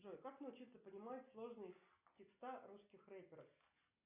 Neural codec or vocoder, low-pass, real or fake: none; 3.6 kHz; real